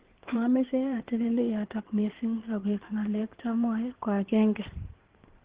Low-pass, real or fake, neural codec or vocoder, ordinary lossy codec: 3.6 kHz; fake; codec, 16 kHz in and 24 kHz out, 2.2 kbps, FireRedTTS-2 codec; Opus, 16 kbps